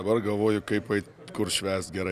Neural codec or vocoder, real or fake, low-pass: none; real; 14.4 kHz